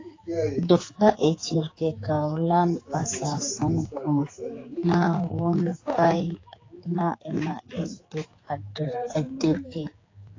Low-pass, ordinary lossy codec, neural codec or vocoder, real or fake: 7.2 kHz; AAC, 32 kbps; codec, 16 kHz, 4 kbps, X-Codec, HuBERT features, trained on general audio; fake